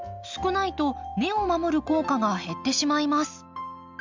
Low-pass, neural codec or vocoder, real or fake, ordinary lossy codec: 7.2 kHz; none; real; MP3, 64 kbps